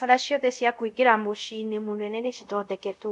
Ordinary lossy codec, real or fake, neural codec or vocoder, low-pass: none; fake; codec, 24 kHz, 0.5 kbps, DualCodec; 10.8 kHz